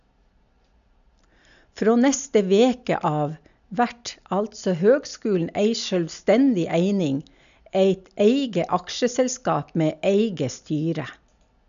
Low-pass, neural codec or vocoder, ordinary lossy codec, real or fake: 7.2 kHz; none; none; real